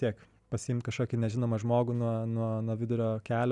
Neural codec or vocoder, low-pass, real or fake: none; 10.8 kHz; real